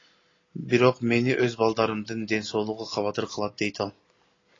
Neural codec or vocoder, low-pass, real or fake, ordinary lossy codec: none; 7.2 kHz; real; AAC, 32 kbps